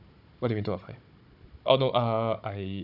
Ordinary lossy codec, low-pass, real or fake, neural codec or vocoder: AAC, 48 kbps; 5.4 kHz; fake; codec, 16 kHz, 16 kbps, FunCodec, trained on Chinese and English, 50 frames a second